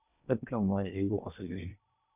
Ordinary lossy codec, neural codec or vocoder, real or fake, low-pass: none; codec, 16 kHz in and 24 kHz out, 0.8 kbps, FocalCodec, streaming, 65536 codes; fake; 3.6 kHz